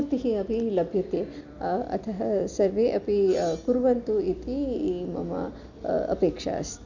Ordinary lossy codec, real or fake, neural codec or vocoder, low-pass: none; real; none; 7.2 kHz